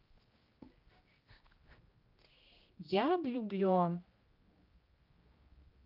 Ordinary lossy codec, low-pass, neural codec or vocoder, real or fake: Opus, 32 kbps; 5.4 kHz; codec, 16 kHz, 1 kbps, X-Codec, HuBERT features, trained on general audio; fake